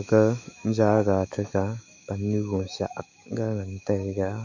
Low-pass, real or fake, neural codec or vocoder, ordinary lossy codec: 7.2 kHz; fake; autoencoder, 48 kHz, 128 numbers a frame, DAC-VAE, trained on Japanese speech; none